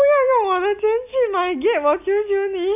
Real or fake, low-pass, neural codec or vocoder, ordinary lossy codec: real; 3.6 kHz; none; none